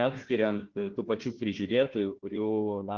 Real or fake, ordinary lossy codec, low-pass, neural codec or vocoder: fake; Opus, 16 kbps; 7.2 kHz; codec, 16 kHz, 1 kbps, FunCodec, trained on Chinese and English, 50 frames a second